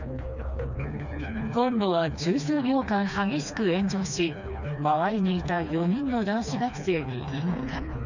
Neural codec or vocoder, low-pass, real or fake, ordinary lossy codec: codec, 16 kHz, 2 kbps, FreqCodec, smaller model; 7.2 kHz; fake; none